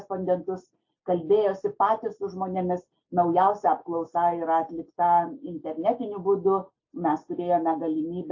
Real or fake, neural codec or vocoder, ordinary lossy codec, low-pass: real; none; AAC, 48 kbps; 7.2 kHz